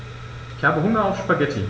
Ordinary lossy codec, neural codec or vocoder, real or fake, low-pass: none; none; real; none